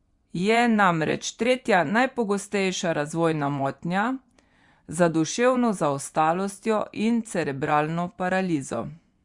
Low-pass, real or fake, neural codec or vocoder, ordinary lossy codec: 10.8 kHz; fake; vocoder, 24 kHz, 100 mel bands, Vocos; Opus, 64 kbps